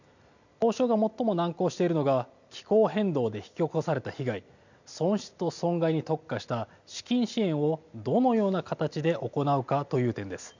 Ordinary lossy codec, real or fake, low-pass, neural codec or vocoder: none; real; 7.2 kHz; none